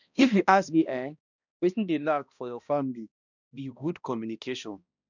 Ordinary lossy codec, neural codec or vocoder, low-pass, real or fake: none; codec, 16 kHz, 1 kbps, X-Codec, HuBERT features, trained on balanced general audio; 7.2 kHz; fake